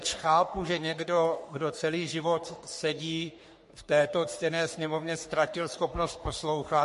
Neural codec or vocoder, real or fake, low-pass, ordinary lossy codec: codec, 44.1 kHz, 3.4 kbps, Pupu-Codec; fake; 14.4 kHz; MP3, 48 kbps